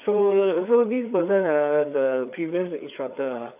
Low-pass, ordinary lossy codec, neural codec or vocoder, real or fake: 3.6 kHz; none; codec, 16 kHz, 4 kbps, FreqCodec, larger model; fake